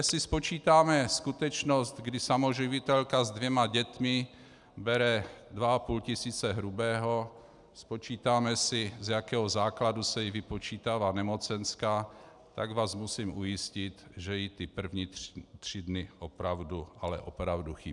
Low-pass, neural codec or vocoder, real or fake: 10.8 kHz; none; real